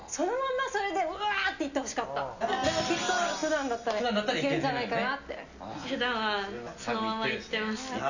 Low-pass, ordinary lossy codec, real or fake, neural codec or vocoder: 7.2 kHz; none; real; none